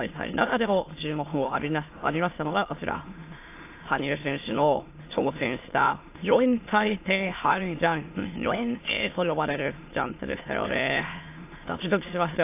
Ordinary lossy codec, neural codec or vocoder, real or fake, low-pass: AAC, 24 kbps; autoencoder, 22.05 kHz, a latent of 192 numbers a frame, VITS, trained on many speakers; fake; 3.6 kHz